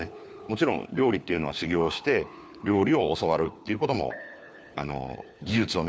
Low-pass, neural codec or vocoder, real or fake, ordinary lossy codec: none; codec, 16 kHz, 4 kbps, FunCodec, trained on LibriTTS, 50 frames a second; fake; none